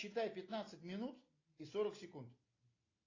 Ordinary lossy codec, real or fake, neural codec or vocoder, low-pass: AAC, 32 kbps; real; none; 7.2 kHz